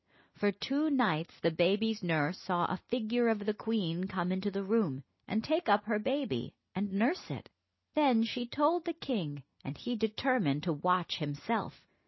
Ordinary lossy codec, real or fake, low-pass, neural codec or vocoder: MP3, 24 kbps; real; 7.2 kHz; none